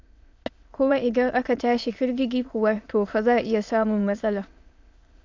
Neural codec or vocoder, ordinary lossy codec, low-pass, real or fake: autoencoder, 22.05 kHz, a latent of 192 numbers a frame, VITS, trained on many speakers; AAC, 48 kbps; 7.2 kHz; fake